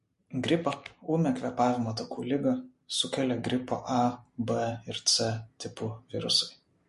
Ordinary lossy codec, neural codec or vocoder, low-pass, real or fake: MP3, 48 kbps; none; 14.4 kHz; real